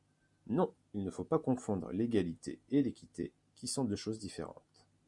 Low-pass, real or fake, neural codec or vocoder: 10.8 kHz; real; none